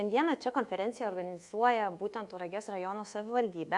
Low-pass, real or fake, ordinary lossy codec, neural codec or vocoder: 10.8 kHz; fake; Opus, 64 kbps; codec, 24 kHz, 1.2 kbps, DualCodec